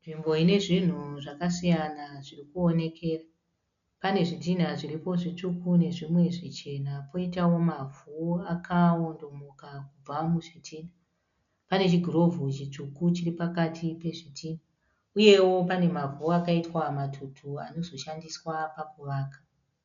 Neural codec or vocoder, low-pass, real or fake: none; 7.2 kHz; real